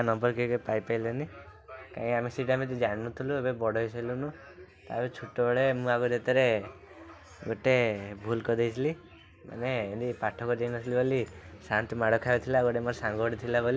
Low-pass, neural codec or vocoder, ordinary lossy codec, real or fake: none; none; none; real